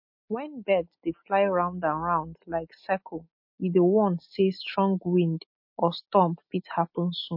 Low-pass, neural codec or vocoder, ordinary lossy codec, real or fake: 5.4 kHz; none; MP3, 32 kbps; real